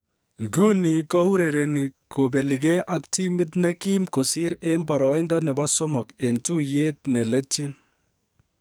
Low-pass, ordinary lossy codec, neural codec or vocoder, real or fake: none; none; codec, 44.1 kHz, 2.6 kbps, SNAC; fake